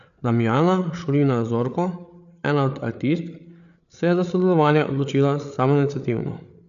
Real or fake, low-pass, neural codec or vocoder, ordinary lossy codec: fake; 7.2 kHz; codec, 16 kHz, 8 kbps, FreqCodec, larger model; none